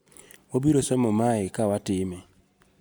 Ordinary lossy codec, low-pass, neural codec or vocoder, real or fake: none; none; none; real